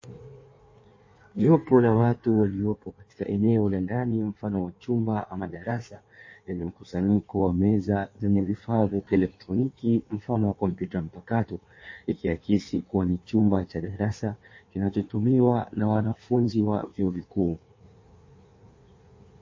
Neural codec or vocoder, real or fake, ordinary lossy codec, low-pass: codec, 16 kHz in and 24 kHz out, 1.1 kbps, FireRedTTS-2 codec; fake; MP3, 32 kbps; 7.2 kHz